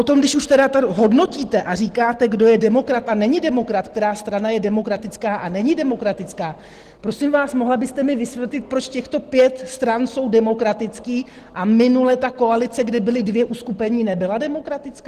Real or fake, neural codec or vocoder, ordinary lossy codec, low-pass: real; none; Opus, 16 kbps; 14.4 kHz